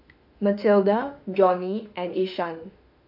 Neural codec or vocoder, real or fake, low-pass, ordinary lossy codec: autoencoder, 48 kHz, 32 numbers a frame, DAC-VAE, trained on Japanese speech; fake; 5.4 kHz; none